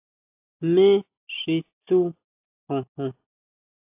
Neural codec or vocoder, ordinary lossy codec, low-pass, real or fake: none; AAC, 24 kbps; 3.6 kHz; real